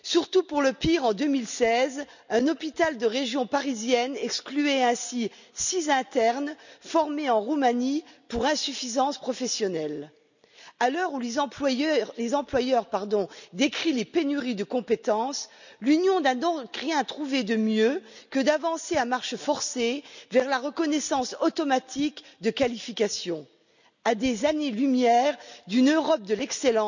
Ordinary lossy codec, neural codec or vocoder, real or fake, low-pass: none; none; real; 7.2 kHz